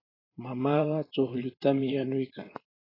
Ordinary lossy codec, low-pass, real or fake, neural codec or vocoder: AAC, 24 kbps; 5.4 kHz; fake; vocoder, 44.1 kHz, 128 mel bands every 512 samples, BigVGAN v2